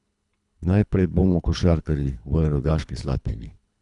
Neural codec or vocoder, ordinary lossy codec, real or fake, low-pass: codec, 24 kHz, 3 kbps, HILCodec; none; fake; 10.8 kHz